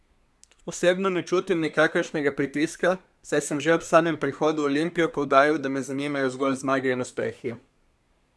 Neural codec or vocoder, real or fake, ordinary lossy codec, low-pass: codec, 24 kHz, 1 kbps, SNAC; fake; none; none